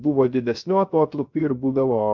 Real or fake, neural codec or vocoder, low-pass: fake; codec, 16 kHz, 0.3 kbps, FocalCodec; 7.2 kHz